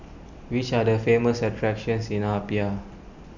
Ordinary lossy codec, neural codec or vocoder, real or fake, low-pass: none; none; real; 7.2 kHz